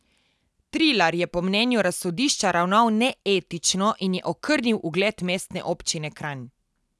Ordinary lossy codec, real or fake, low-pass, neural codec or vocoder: none; real; none; none